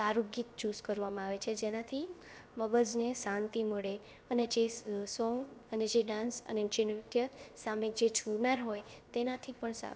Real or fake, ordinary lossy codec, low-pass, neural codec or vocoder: fake; none; none; codec, 16 kHz, 0.7 kbps, FocalCodec